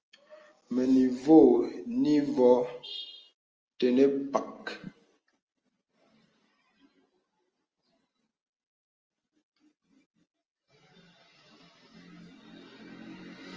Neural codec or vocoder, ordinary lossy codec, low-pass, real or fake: none; Opus, 24 kbps; 7.2 kHz; real